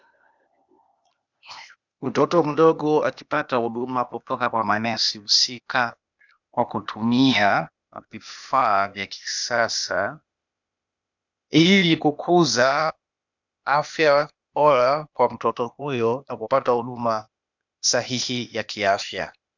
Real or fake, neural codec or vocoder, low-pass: fake; codec, 16 kHz, 0.8 kbps, ZipCodec; 7.2 kHz